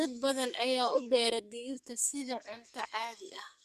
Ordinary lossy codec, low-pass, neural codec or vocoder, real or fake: Opus, 64 kbps; 14.4 kHz; codec, 44.1 kHz, 2.6 kbps, SNAC; fake